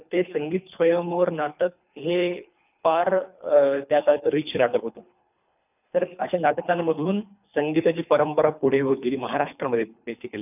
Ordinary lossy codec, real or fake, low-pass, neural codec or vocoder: none; fake; 3.6 kHz; codec, 24 kHz, 3 kbps, HILCodec